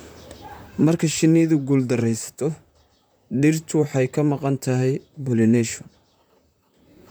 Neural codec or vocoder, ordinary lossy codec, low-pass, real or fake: vocoder, 44.1 kHz, 128 mel bands, Pupu-Vocoder; none; none; fake